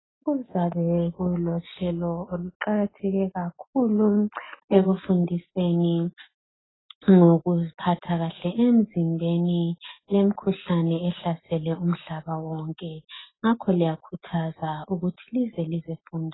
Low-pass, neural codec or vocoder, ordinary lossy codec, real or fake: 7.2 kHz; none; AAC, 16 kbps; real